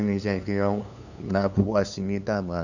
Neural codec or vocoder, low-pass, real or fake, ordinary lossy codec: codec, 16 kHz, 2 kbps, FunCodec, trained on LibriTTS, 25 frames a second; 7.2 kHz; fake; none